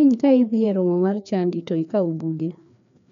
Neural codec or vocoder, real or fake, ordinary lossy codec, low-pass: codec, 16 kHz, 2 kbps, FreqCodec, larger model; fake; none; 7.2 kHz